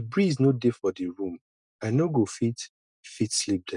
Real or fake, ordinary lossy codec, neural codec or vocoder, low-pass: real; none; none; 10.8 kHz